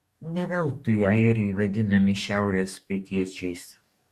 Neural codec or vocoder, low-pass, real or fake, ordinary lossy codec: codec, 44.1 kHz, 2.6 kbps, DAC; 14.4 kHz; fake; Opus, 64 kbps